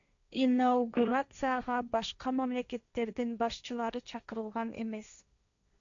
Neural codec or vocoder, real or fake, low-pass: codec, 16 kHz, 1.1 kbps, Voila-Tokenizer; fake; 7.2 kHz